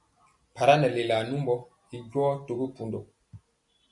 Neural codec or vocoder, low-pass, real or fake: none; 10.8 kHz; real